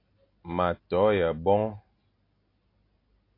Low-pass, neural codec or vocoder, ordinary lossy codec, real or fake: 5.4 kHz; none; AAC, 32 kbps; real